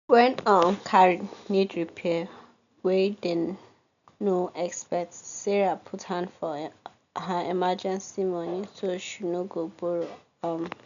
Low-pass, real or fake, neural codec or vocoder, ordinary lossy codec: 7.2 kHz; real; none; none